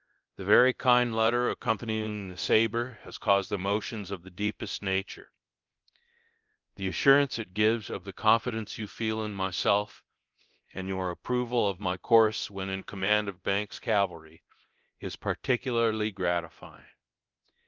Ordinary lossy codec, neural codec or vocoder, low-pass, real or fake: Opus, 32 kbps; codec, 24 kHz, 0.9 kbps, DualCodec; 7.2 kHz; fake